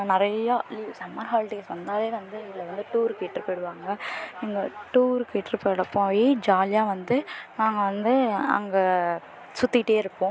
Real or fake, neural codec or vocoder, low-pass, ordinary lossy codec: real; none; none; none